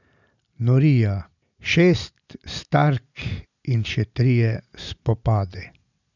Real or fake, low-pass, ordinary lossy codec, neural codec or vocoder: real; 7.2 kHz; none; none